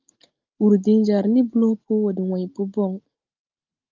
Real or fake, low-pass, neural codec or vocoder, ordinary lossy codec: real; 7.2 kHz; none; Opus, 32 kbps